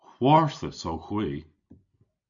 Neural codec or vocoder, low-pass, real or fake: none; 7.2 kHz; real